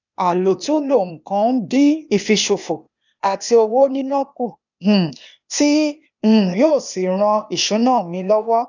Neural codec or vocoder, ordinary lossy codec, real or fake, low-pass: codec, 16 kHz, 0.8 kbps, ZipCodec; none; fake; 7.2 kHz